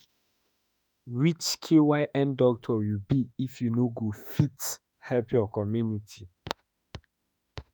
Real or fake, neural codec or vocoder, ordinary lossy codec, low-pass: fake; autoencoder, 48 kHz, 32 numbers a frame, DAC-VAE, trained on Japanese speech; none; none